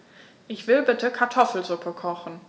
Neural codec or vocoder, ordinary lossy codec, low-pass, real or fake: none; none; none; real